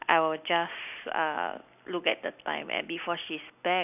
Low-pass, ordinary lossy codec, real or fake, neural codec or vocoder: 3.6 kHz; none; real; none